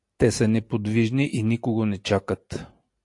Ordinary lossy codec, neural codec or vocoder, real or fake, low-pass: AAC, 64 kbps; none; real; 10.8 kHz